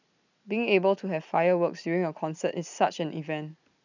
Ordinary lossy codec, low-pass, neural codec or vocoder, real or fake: none; 7.2 kHz; none; real